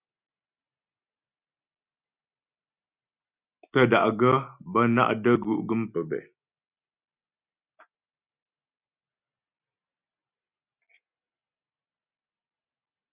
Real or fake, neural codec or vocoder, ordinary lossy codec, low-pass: real; none; Opus, 64 kbps; 3.6 kHz